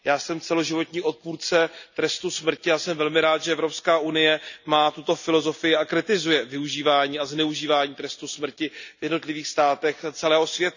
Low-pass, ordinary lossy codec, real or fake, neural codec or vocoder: 7.2 kHz; none; real; none